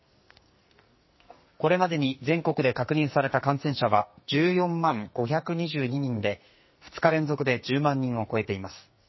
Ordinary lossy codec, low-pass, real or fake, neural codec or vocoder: MP3, 24 kbps; 7.2 kHz; fake; codec, 44.1 kHz, 2.6 kbps, SNAC